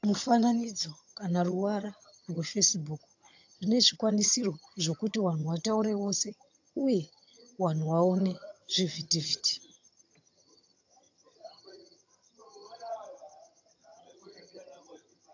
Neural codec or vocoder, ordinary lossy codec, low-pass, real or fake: vocoder, 22.05 kHz, 80 mel bands, HiFi-GAN; AAC, 48 kbps; 7.2 kHz; fake